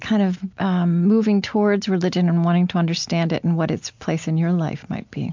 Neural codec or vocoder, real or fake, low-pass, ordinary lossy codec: none; real; 7.2 kHz; MP3, 64 kbps